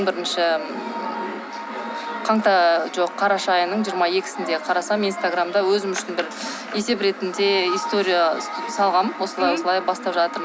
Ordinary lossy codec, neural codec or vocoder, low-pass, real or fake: none; none; none; real